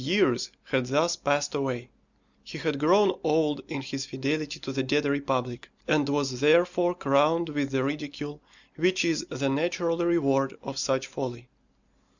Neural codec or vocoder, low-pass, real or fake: none; 7.2 kHz; real